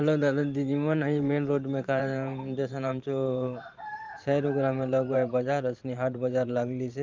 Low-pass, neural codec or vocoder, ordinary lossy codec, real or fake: 7.2 kHz; vocoder, 44.1 kHz, 128 mel bands every 512 samples, BigVGAN v2; Opus, 24 kbps; fake